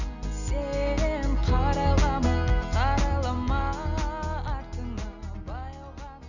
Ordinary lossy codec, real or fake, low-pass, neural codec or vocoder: none; real; 7.2 kHz; none